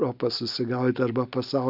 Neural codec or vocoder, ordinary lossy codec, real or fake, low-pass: none; AAC, 48 kbps; real; 5.4 kHz